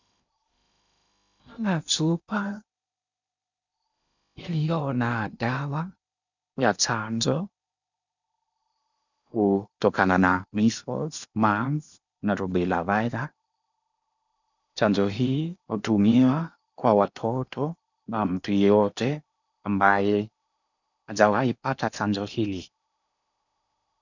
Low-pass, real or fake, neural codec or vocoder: 7.2 kHz; fake; codec, 16 kHz in and 24 kHz out, 0.8 kbps, FocalCodec, streaming, 65536 codes